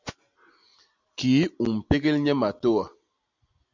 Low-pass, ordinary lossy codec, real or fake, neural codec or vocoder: 7.2 kHz; MP3, 64 kbps; real; none